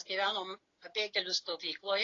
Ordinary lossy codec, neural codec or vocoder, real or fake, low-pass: AAC, 32 kbps; none; real; 7.2 kHz